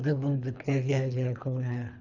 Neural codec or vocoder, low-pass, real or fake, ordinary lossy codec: codec, 24 kHz, 3 kbps, HILCodec; 7.2 kHz; fake; none